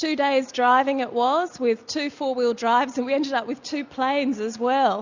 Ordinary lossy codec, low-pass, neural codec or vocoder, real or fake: Opus, 64 kbps; 7.2 kHz; none; real